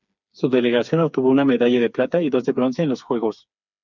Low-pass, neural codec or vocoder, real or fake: 7.2 kHz; codec, 16 kHz, 4 kbps, FreqCodec, smaller model; fake